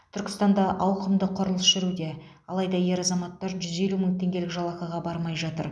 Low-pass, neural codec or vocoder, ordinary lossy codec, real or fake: none; none; none; real